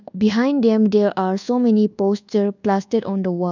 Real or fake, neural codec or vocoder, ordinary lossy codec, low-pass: fake; codec, 24 kHz, 1.2 kbps, DualCodec; none; 7.2 kHz